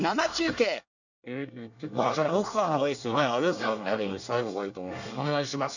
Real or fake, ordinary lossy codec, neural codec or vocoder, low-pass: fake; none; codec, 24 kHz, 1 kbps, SNAC; 7.2 kHz